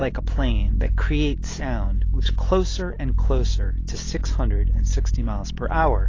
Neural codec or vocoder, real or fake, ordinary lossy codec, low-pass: none; real; AAC, 32 kbps; 7.2 kHz